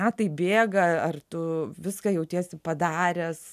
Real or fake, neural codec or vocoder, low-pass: real; none; 14.4 kHz